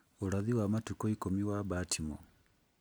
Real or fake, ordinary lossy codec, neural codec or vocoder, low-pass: real; none; none; none